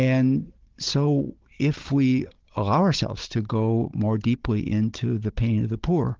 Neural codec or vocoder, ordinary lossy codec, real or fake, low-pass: codec, 16 kHz, 16 kbps, FunCodec, trained on Chinese and English, 50 frames a second; Opus, 16 kbps; fake; 7.2 kHz